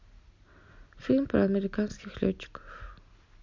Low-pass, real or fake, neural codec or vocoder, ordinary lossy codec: 7.2 kHz; real; none; MP3, 48 kbps